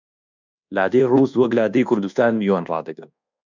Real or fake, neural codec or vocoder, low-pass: fake; codec, 24 kHz, 1.2 kbps, DualCodec; 7.2 kHz